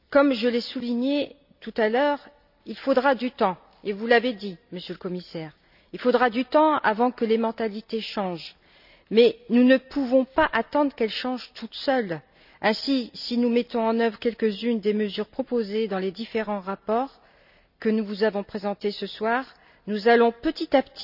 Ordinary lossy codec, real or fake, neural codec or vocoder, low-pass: none; real; none; 5.4 kHz